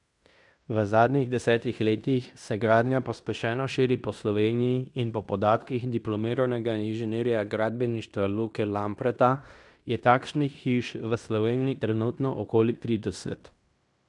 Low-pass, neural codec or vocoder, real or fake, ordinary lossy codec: 10.8 kHz; codec, 16 kHz in and 24 kHz out, 0.9 kbps, LongCat-Audio-Codec, fine tuned four codebook decoder; fake; none